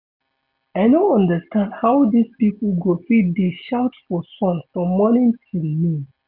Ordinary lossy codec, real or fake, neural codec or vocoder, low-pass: none; real; none; 5.4 kHz